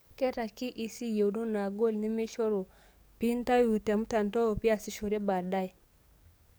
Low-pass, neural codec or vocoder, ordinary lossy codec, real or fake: none; codec, 44.1 kHz, 7.8 kbps, DAC; none; fake